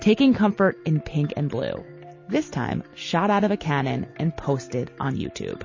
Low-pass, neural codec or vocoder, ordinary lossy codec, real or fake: 7.2 kHz; none; MP3, 32 kbps; real